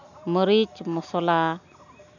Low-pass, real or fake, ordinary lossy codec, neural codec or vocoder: 7.2 kHz; real; none; none